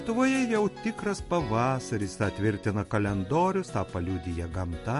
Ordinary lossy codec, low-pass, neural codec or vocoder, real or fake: MP3, 48 kbps; 14.4 kHz; none; real